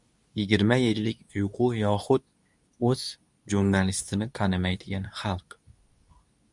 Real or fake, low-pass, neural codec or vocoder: fake; 10.8 kHz; codec, 24 kHz, 0.9 kbps, WavTokenizer, medium speech release version 2